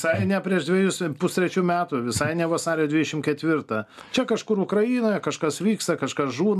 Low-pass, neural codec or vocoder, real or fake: 14.4 kHz; none; real